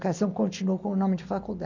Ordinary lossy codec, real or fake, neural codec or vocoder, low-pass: none; real; none; 7.2 kHz